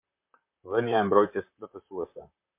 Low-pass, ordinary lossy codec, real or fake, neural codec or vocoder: 3.6 kHz; MP3, 32 kbps; fake; vocoder, 44.1 kHz, 128 mel bands, Pupu-Vocoder